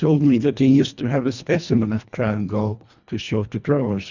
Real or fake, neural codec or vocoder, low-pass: fake; codec, 24 kHz, 1.5 kbps, HILCodec; 7.2 kHz